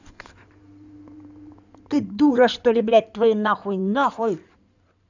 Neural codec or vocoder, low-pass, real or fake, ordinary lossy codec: codec, 16 kHz in and 24 kHz out, 2.2 kbps, FireRedTTS-2 codec; 7.2 kHz; fake; none